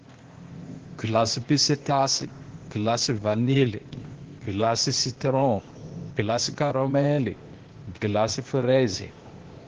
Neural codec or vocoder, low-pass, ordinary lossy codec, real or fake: codec, 16 kHz, 0.8 kbps, ZipCodec; 7.2 kHz; Opus, 16 kbps; fake